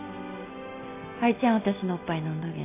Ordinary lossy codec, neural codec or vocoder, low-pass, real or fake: AAC, 24 kbps; none; 3.6 kHz; real